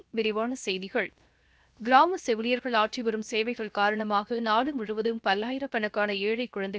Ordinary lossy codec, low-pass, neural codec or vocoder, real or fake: none; none; codec, 16 kHz, 0.7 kbps, FocalCodec; fake